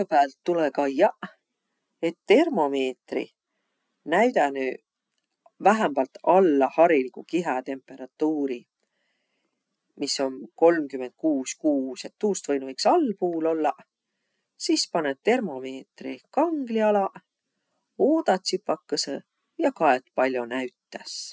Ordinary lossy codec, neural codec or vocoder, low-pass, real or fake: none; none; none; real